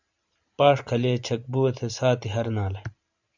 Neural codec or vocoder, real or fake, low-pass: vocoder, 44.1 kHz, 128 mel bands every 512 samples, BigVGAN v2; fake; 7.2 kHz